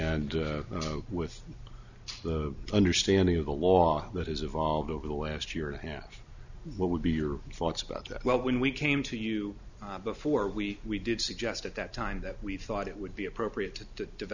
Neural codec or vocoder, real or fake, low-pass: none; real; 7.2 kHz